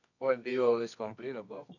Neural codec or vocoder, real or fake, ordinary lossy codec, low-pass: codec, 24 kHz, 0.9 kbps, WavTokenizer, medium music audio release; fake; none; 7.2 kHz